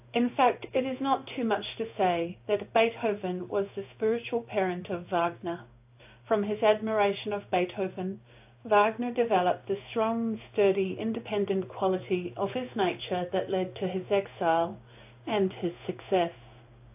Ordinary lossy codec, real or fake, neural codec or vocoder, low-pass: AAC, 32 kbps; fake; codec, 16 kHz in and 24 kHz out, 1 kbps, XY-Tokenizer; 3.6 kHz